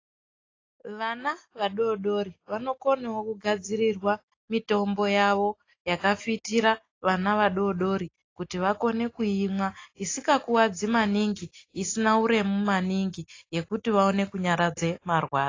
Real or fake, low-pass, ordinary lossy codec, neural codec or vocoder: real; 7.2 kHz; AAC, 32 kbps; none